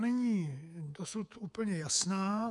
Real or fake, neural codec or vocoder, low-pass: fake; codec, 24 kHz, 3.1 kbps, DualCodec; 10.8 kHz